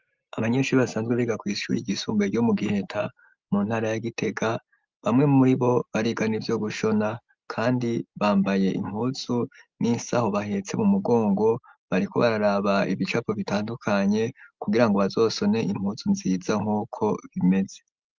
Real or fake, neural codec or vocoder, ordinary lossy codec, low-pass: real; none; Opus, 24 kbps; 7.2 kHz